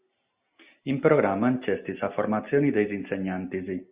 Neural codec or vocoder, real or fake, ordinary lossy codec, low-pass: none; real; Opus, 64 kbps; 3.6 kHz